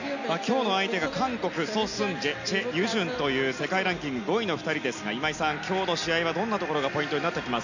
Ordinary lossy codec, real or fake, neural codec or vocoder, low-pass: none; real; none; 7.2 kHz